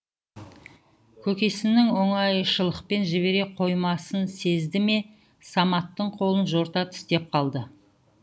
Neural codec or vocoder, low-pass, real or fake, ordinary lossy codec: none; none; real; none